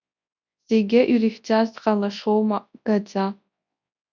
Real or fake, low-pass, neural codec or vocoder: fake; 7.2 kHz; codec, 24 kHz, 0.9 kbps, WavTokenizer, large speech release